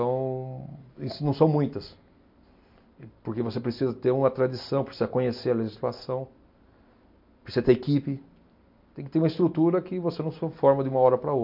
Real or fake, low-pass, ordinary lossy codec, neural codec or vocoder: real; 5.4 kHz; MP3, 48 kbps; none